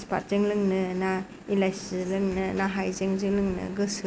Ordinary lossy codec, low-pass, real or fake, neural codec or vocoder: none; none; real; none